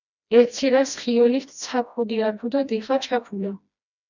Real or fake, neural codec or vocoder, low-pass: fake; codec, 16 kHz, 1 kbps, FreqCodec, smaller model; 7.2 kHz